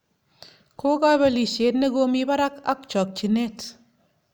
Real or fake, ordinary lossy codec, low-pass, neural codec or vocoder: real; none; none; none